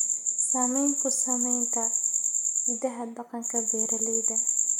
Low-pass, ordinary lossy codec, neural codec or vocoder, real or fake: none; none; none; real